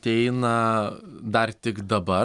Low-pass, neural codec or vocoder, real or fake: 10.8 kHz; none; real